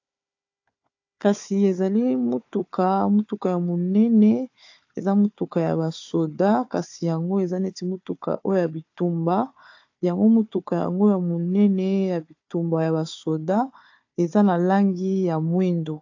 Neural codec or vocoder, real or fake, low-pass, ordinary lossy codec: codec, 16 kHz, 4 kbps, FunCodec, trained on Chinese and English, 50 frames a second; fake; 7.2 kHz; AAC, 48 kbps